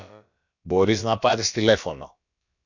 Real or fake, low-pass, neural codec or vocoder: fake; 7.2 kHz; codec, 16 kHz, about 1 kbps, DyCAST, with the encoder's durations